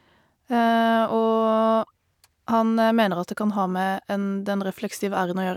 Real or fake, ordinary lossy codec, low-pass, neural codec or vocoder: real; none; 19.8 kHz; none